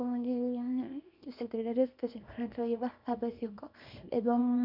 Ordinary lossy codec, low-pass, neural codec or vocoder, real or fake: none; 5.4 kHz; codec, 24 kHz, 0.9 kbps, WavTokenizer, small release; fake